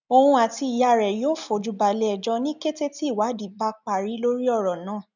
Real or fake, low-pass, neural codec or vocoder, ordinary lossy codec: real; 7.2 kHz; none; none